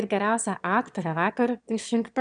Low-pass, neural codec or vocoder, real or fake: 9.9 kHz; autoencoder, 22.05 kHz, a latent of 192 numbers a frame, VITS, trained on one speaker; fake